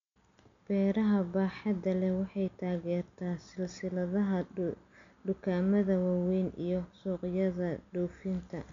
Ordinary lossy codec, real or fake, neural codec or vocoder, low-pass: MP3, 64 kbps; real; none; 7.2 kHz